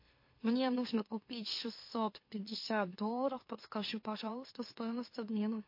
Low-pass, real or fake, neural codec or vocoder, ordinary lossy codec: 5.4 kHz; fake; autoencoder, 44.1 kHz, a latent of 192 numbers a frame, MeloTTS; MP3, 32 kbps